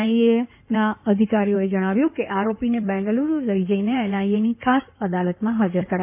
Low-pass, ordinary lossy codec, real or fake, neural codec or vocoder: 3.6 kHz; none; fake; codec, 16 kHz in and 24 kHz out, 2.2 kbps, FireRedTTS-2 codec